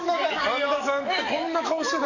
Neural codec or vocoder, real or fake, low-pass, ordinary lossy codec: codec, 44.1 kHz, 7.8 kbps, DAC; fake; 7.2 kHz; none